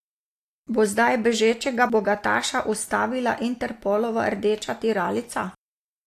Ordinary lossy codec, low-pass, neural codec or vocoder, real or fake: AAC, 64 kbps; 14.4 kHz; none; real